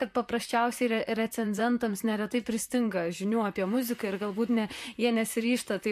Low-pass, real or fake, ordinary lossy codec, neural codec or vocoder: 14.4 kHz; fake; MP3, 64 kbps; vocoder, 44.1 kHz, 128 mel bands, Pupu-Vocoder